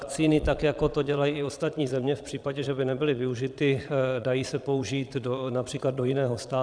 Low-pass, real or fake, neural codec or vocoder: 9.9 kHz; fake; vocoder, 22.05 kHz, 80 mel bands, Vocos